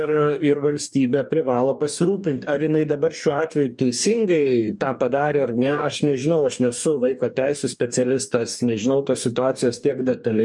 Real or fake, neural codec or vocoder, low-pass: fake; codec, 44.1 kHz, 2.6 kbps, DAC; 10.8 kHz